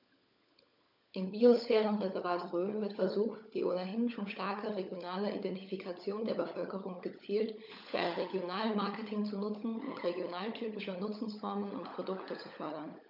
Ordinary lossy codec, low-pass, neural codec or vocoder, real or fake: none; 5.4 kHz; codec, 16 kHz, 16 kbps, FunCodec, trained on LibriTTS, 50 frames a second; fake